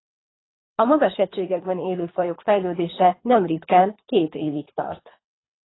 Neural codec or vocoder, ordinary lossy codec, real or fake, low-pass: codec, 24 kHz, 3 kbps, HILCodec; AAC, 16 kbps; fake; 7.2 kHz